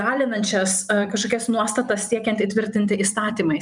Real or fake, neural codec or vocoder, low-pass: fake; vocoder, 44.1 kHz, 128 mel bands every 256 samples, BigVGAN v2; 10.8 kHz